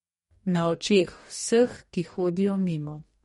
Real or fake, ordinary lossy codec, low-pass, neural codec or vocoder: fake; MP3, 48 kbps; 19.8 kHz; codec, 44.1 kHz, 2.6 kbps, DAC